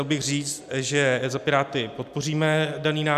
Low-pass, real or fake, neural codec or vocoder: 14.4 kHz; real; none